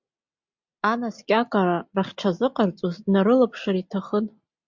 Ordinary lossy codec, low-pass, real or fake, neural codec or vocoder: MP3, 64 kbps; 7.2 kHz; real; none